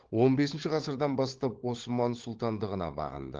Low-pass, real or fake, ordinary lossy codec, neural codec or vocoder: 7.2 kHz; fake; Opus, 16 kbps; codec, 16 kHz, 16 kbps, FunCodec, trained on LibriTTS, 50 frames a second